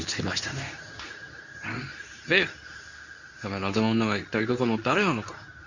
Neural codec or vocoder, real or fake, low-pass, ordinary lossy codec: codec, 24 kHz, 0.9 kbps, WavTokenizer, medium speech release version 2; fake; 7.2 kHz; Opus, 64 kbps